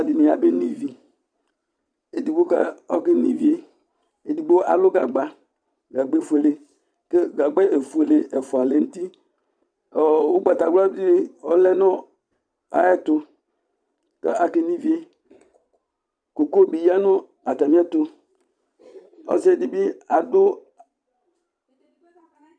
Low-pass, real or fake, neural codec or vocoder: 9.9 kHz; fake; vocoder, 22.05 kHz, 80 mel bands, Vocos